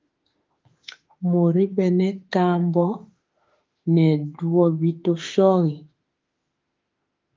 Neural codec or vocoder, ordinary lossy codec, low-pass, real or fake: autoencoder, 48 kHz, 32 numbers a frame, DAC-VAE, trained on Japanese speech; Opus, 32 kbps; 7.2 kHz; fake